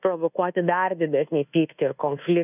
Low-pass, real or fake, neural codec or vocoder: 3.6 kHz; fake; codec, 24 kHz, 1.2 kbps, DualCodec